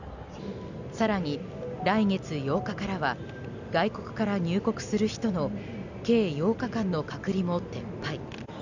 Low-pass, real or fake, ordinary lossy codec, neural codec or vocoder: 7.2 kHz; real; none; none